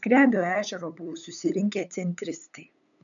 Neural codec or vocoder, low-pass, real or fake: codec, 16 kHz, 8 kbps, FunCodec, trained on LibriTTS, 25 frames a second; 7.2 kHz; fake